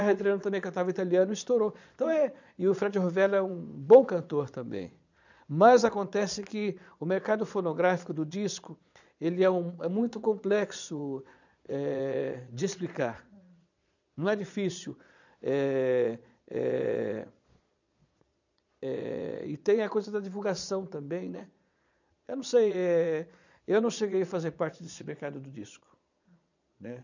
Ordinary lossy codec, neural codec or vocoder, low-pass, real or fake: none; vocoder, 22.05 kHz, 80 mel bands, Vocos; 7.2 kHz; fake